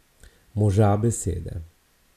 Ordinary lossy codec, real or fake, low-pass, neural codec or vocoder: none; real; 14.4 kHz; none